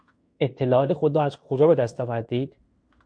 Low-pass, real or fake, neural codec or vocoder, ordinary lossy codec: 9.9 kHz; fake; codec, 16 kHz in and 24 kHz out, 0.9 kbps, LongCat-Audio-Codec, fine tuned four codebook decoder; MP3, 64 kbps